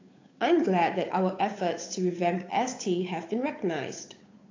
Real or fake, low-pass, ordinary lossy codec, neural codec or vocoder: fake; 7.2 kHz; AAC, 32 kbps; codec, 16 kHz, 8 kbps, FunCodec, trained on Chinese and English, 25 frames a second